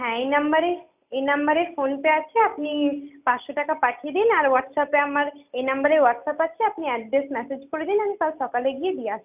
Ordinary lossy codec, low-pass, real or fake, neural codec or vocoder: none; 3.6 kHz; real; none